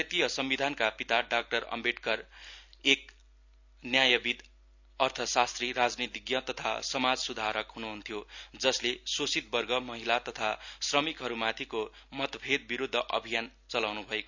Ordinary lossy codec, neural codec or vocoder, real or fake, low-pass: none; none; real; 7.2 kHz